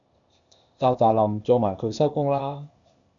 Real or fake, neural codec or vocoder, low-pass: fake; codec, 16 kHz, 0.8 kbps, ZipCodec; 7.2 kHz